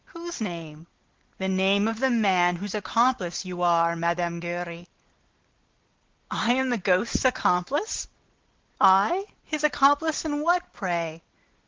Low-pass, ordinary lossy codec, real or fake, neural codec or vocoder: 7.2 kHz; Opus, 16 kbps; real; none